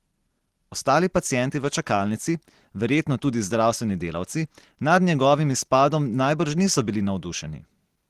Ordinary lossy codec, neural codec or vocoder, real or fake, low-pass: Opus, 16 kbps; none; real; 14.4 kHz